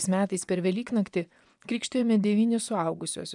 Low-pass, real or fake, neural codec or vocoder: 10.8 kHz; fake; vocoder, 24 kHz, 100 mel bands, Vocos